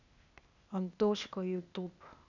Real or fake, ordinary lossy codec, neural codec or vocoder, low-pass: fake; none; codec, 16 kHz, 0.8 kbps, ZipCodec; 7.2 kHz